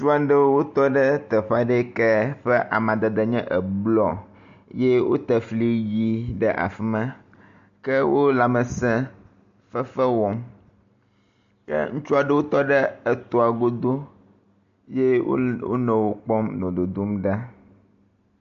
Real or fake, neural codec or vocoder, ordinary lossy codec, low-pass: real; none; AAC, 64 kbps; 7.2 kHz